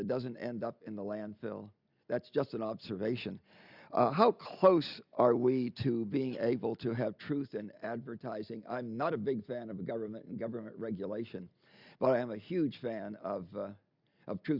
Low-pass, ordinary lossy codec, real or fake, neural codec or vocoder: 5.4 kHz; Opus, 64 kbps; real; none